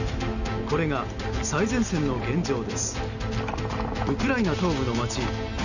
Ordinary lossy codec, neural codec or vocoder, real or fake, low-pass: none; none; real; 7.2 kHz